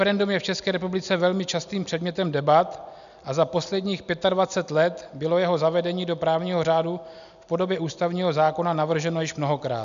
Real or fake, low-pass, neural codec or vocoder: real; 7.2 kHz; none